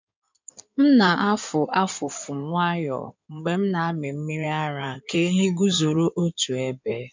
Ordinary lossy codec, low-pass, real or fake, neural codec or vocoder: MP3, 64 kbps; 7.2 kHz; fake; codec, 16 kHz in and 24 kHz out, 2.2 kbps, FireRedTTS-2 codec